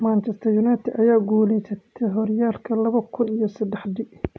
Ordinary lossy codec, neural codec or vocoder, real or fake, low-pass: none; none; real; none